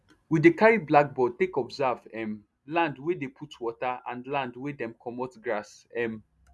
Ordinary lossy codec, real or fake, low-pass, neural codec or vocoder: none; real; none; none